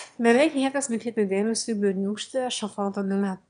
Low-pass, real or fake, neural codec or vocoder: 9.9 kHz; fake; autoencoder, 22.05 kHz, a latent of 192 numbers a frame, VITS, trained on one speaker